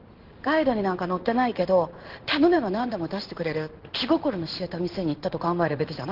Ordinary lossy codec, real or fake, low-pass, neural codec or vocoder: Opus, 16 kbps; fake; 5.4 kHz; codec, 16 kHz in and 24 kHz out, 1 kbps, XY-Tokenizer